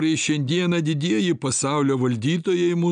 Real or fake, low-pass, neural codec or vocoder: real; 9.9 kHz; none